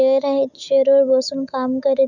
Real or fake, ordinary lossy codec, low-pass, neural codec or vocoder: fake; none; 7.2 kHz; autoencoder, 48 kHz, 128 numbers a frame, DAC-VAE, trained on Japanese speech